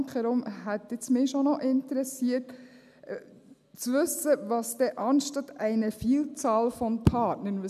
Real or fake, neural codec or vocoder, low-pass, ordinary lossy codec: real; none; 14.4 kHz; none